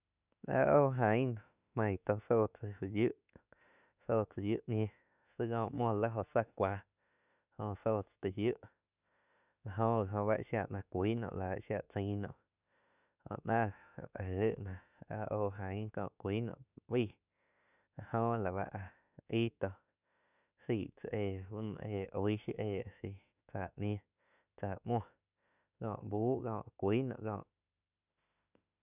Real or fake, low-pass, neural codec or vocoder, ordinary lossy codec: fake; 3.6 kHz; autoencoder, 48 kHz, 32 numbers a frame, DAC-VAE, trained on Japanese speech; none